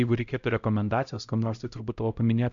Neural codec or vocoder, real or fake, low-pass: codec, 16 kHz, 0.5 kbps, X-Codec, HuBERT features, trained on LibriSpeech; fake; 7.2 kHz